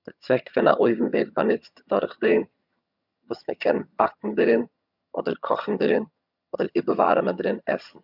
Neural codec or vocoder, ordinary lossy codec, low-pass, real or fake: vocoder, 22.05 kHz, 80 mel bands, HiFi-GAN; none; 5.4 kHz; fake